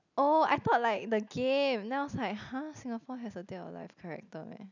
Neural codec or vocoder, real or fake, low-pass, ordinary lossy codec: none; real; 7.2 kHz; none